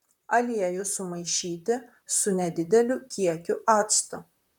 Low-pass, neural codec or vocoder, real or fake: 19.8 kHz; vocoder, 44.1 kHz, 128 mel bands, Pupu-Vocoder; fake